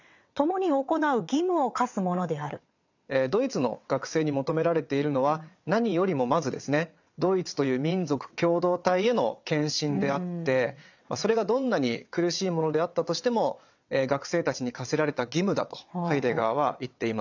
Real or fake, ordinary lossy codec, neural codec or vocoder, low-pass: fake; none; vocoder, 22.05 kHz, 80 mel bands, WaveNeXt; 7.2 kHz